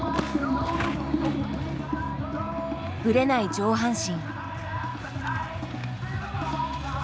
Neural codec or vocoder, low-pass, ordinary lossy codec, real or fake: none; none; none; real